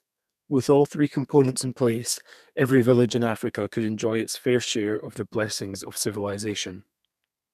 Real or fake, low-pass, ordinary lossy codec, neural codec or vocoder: fake; 14.4 kHz; none; codec, 32 kHz, 1.9 kbps, SNAC